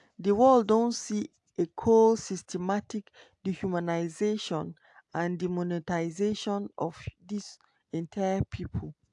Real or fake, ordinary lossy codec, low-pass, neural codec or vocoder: real; AAC, 64 kbps; 10.8 kHz; none